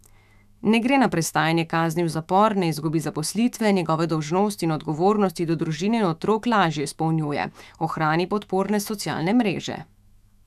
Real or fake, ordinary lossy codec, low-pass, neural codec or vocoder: fake; none; 14.4 kHz; autoencoder, 48 kHz, 128 numbers a frame, DAC-VAE, trained on Japanese speech